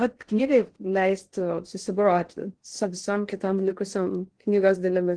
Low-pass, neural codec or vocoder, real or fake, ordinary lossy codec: 9.9 kHz; codec, 16 kHz in and 24 kHz out, 0.6 kbps, FocalCodec, streaming, 4096 codes; fake; Opus, 16 kbps